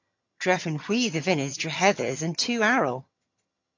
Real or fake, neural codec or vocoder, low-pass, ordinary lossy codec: fake; vocoder, 22.05 kHz, 80 mel bands, HiFi-GAN; 7.2 kHz; AAC, 48 kbps